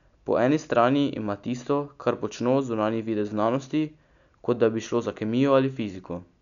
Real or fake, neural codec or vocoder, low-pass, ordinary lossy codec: real; none; 7.2 kHz; MP3, 96 kbps